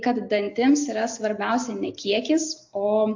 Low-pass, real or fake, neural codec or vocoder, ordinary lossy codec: 7.2 kHz; real; none; AAC, 48 kbps